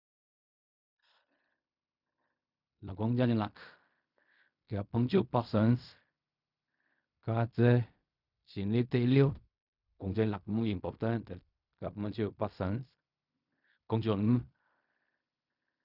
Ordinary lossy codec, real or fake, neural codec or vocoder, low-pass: none; fake; codec, 16 kHz in and 24 kHz out, 0.4 kbps, LongCat-Audio-Codec, fine tuned four codebook decoder; 5.4 kHz